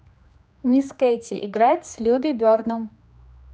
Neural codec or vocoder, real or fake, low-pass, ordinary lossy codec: codec, 16 kHz, 2 kbps, X-Codec, HuBERT features, trained on general audio; fake; none; none